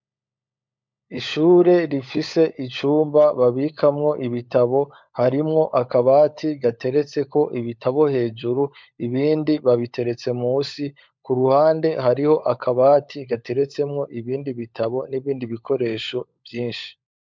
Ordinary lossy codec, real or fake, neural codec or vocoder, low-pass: MP3, 64 kbps; fake; codec, 16 kHz, 16 kbps, FunCodec, trained on LibriTTS, 50 frames a second; 7.2 kHz